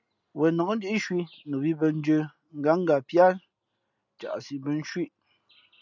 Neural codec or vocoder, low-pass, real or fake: none; 7.2 kHz; real